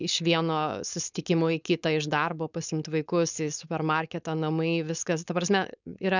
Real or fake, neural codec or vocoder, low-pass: fake; codec, 16 kHz, 4.8 kbps, FACodec; 7.2 kHz